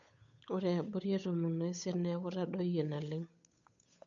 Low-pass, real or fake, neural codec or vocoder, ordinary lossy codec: 7.2 kHz; fake; codec, 16 kHz, 8 kbps, FunCodec, trained on Chinese and English, 25 frames a second; none